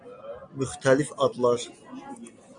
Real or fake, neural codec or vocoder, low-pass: real; none; 9.9 kHz